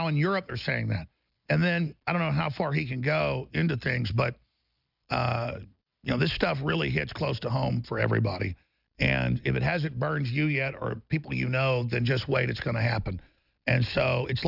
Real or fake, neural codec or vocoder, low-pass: real; none; 5.4 kHz